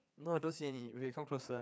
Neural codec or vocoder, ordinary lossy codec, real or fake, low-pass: codec, 16 kHz, 4 kbps, FreqCodec, larger model; none; fake; none